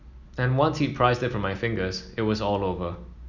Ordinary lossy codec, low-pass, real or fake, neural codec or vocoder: none; 7.2 kHz; real; none